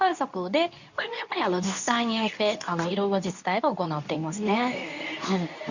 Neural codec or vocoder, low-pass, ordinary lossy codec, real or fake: codec, 24 kHz, 0.9 kbps, WavTokenizer, medium speech release version 2; 7.2 kHz; none; fake